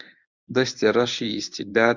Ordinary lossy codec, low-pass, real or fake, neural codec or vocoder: Opus, 64 kbps; 7.2 kHz; fake; codec, 24 kHz, 0.9 kbps, WavTokenizer, medium speech release version 1